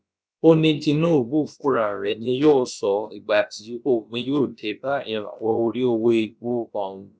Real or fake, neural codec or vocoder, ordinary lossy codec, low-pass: fake; codec, 16 kHz, about 1 kbps, DyCAST, with the encoder's durations; none; none